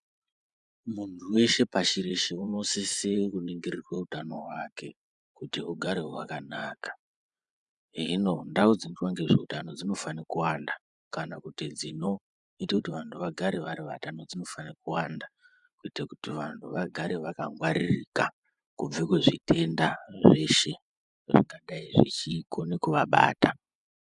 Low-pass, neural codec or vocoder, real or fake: 10.8 kHz; vocoder, 24 kHz, 100 mel bands, Vocos; fake